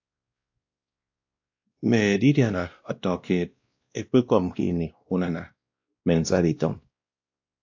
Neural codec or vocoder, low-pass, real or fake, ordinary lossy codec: codec, 16 kHz, 1 kbps, X-Codec, WavLM features, trained on Multilingual LibriSpeech; 7.2 kHz; fake; AAC, 48 kbps